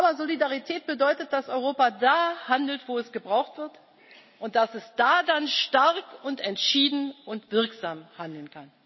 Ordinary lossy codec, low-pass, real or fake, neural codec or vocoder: MP3, 24 kbps; 7.2 kHz; real; none